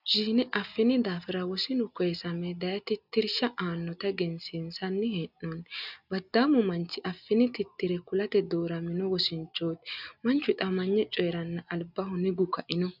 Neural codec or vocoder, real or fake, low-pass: none; real; 5.4 kHz